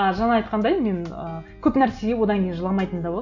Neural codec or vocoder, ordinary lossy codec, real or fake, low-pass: none; none; real; 7.2 kHz